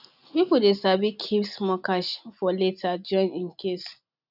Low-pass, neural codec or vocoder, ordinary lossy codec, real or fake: 5.4 kHz; none; none; real